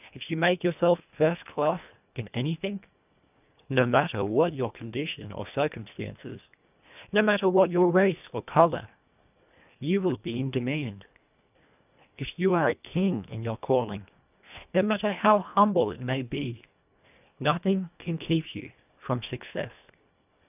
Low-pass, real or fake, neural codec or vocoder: 3.6 kHz; fake; codec, 24 kHz, 1.5 kbps, HILCodec